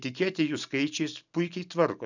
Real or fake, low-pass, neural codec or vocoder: real; 7.2 kHz; none